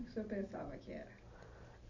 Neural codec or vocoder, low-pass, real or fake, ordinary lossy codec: none; 7.2 kHz; real; none